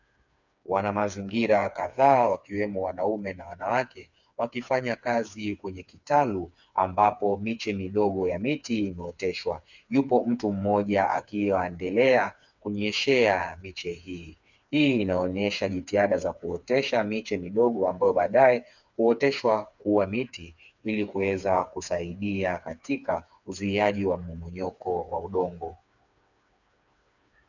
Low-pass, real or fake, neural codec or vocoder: 7.2 kHz; fake; codec, 16 kHz, 4 kbps, FreqCodec, smaller model